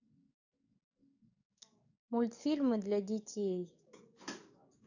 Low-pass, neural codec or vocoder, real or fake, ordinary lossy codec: 7.2 kHz; codec, 44.1 kHz, 7.8 kbps, DAC; fake; none